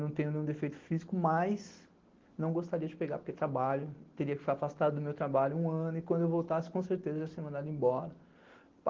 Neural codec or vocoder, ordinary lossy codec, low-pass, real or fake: none; Opus, 16 kbps; 7.2 kHz; real